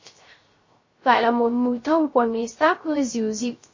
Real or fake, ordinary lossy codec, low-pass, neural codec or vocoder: fake; MP3, 32 kbps; 7.2 kHz; codec, 16 kHz, 0.3 kbps, FocalCodec